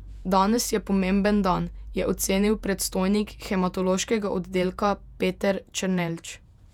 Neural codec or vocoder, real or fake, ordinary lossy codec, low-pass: vocoder, 48 kHz, 128 mel bands, Vocos; fake; none; 19.8 kHz